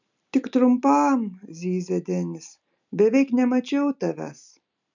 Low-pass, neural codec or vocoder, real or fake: 7.2 kHz; none; real